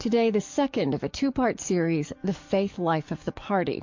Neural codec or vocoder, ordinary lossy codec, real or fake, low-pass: codec, 44.1 kHz, 7.8 kbps, DAC; MP3, 48 kbps; fake; 7.2 kHz